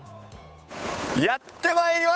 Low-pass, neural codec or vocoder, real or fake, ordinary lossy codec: none; codec, 16 kHz, 8 kbps, FunCodec, trained on Chinese and English, 25 frames a second; fake; none